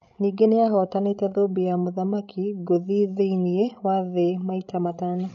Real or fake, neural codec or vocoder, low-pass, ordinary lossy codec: fake; codec, 16 kHz, 16 kbps, FreqCodec, larger model; 7.2 kHz; none